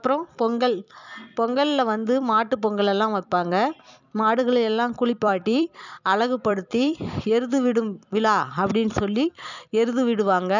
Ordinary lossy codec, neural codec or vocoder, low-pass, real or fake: none; vocoder, 44.1 kHz, 128 mel bands every 256 samples, BigVGAN v2; 7.2 kHz; fake